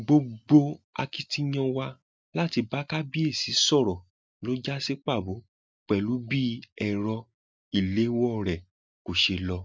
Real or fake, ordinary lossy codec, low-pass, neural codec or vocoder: real; none; none; none